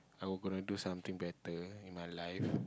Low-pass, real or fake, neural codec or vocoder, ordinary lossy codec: none; real; none; none